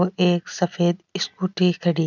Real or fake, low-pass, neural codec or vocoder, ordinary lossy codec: real; 7.2 kHz; none; none